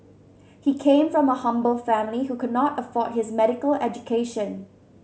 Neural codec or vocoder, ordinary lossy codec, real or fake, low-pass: none; none; real; none